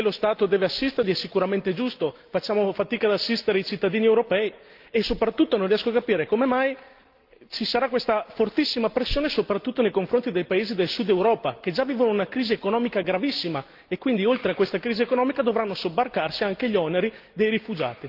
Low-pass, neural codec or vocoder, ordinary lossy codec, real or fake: 5.4 kHz; none; Opus, 32 kbps; real